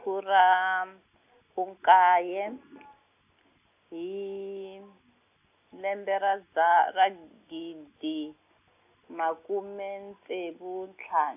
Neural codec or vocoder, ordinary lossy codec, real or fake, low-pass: none; AAC, 32 kbps; real; 3.6 kHz